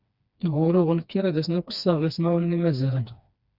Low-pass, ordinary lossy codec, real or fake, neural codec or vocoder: 5.4 kHz; Opus, 64 kbps; fake; codec, 16 kHz, 2 kbps, FreqCodec, smaller model